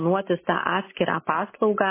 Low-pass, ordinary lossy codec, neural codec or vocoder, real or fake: 3.6 kHz; MP3, 16 kbps; none; real